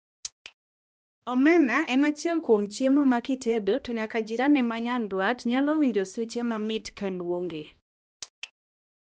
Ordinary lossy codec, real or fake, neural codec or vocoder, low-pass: none; fake; codec, 16 kHz, 1 kbps, X-Codec, HuBERT features, trained on balanced general audio; none